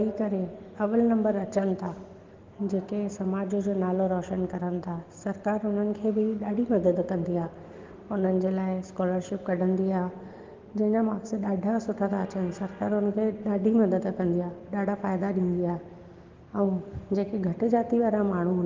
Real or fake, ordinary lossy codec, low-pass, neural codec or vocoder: real; Opus, 16 kbps; 7.2 kHz; none